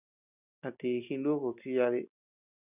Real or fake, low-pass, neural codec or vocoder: real; 3.6 kHz; none